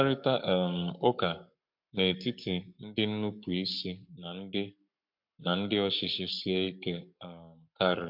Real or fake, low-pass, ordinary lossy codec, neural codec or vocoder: fake; 5.4 kHz; MP3, 48 kbps; codec, 44.1 kHz, 7.8 kbps, Pupu-Codec